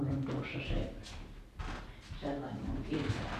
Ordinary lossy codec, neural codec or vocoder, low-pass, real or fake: none; none; 14.4 kHz; real